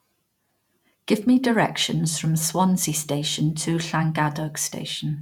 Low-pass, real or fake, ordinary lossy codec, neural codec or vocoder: 19.8 kHz; fake; none; vocoder, 48 kHz, 128 mel bands, Vocos